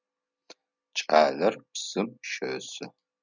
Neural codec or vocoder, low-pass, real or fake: none; 7.2 kHz; real